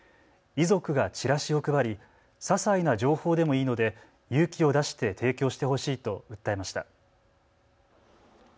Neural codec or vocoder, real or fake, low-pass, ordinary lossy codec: none; real; none; none